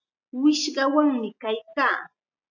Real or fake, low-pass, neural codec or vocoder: real; 7.2 kHz; none